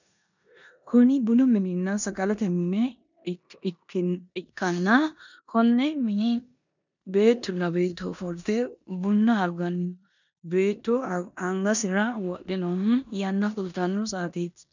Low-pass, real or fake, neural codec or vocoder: 7.2 kHz; fake; codec, 16 kHz in and 24 kHz out, 0.9 kbps, LongCat-Audio-Codec, four codebook decoder